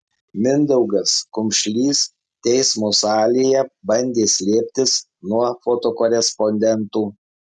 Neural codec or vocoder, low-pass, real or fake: none; 10.8 kHz; real